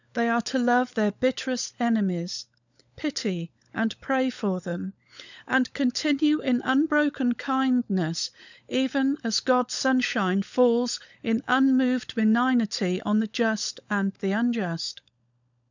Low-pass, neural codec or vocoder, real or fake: 7.2 kHz; codec, 16 kHz, 16 kbps, FunCodec, trained on LibriTTS, 50 frames a second; fake